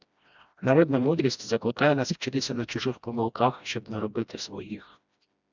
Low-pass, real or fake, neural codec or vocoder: 7.2 kHz; fake; codec, 16 kHz, 1 kbps, FreqCodec, smaller model